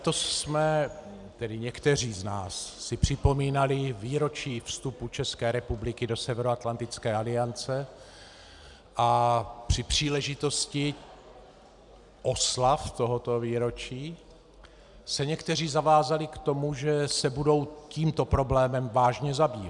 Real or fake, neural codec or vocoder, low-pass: real; none; 10.8 kHz